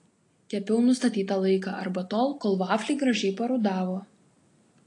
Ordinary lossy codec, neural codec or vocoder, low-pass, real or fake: AAC, 48 kbps; none; 10.8 kHz; real